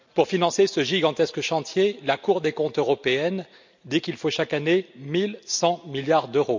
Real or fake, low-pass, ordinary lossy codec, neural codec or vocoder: real; 7.2 kHz; MP3, 64 kbps; none